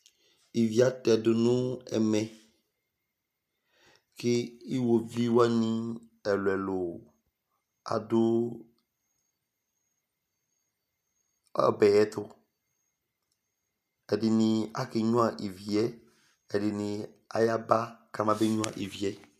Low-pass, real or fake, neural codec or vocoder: 14.4 kHz; real; none